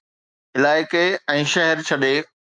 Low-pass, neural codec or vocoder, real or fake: 9.9 kHz; autoencoder, 48 kHz, 128 numbers a frame, DAC-VAE, trained on Japanese speech; fake